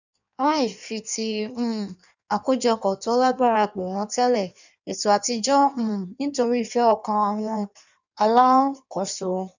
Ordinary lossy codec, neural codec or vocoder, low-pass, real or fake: none; codec, 16 kHz in and 24 kHz out, 1.1 kbps, FireRedTTS-2 codec; 7.2 kHz; fake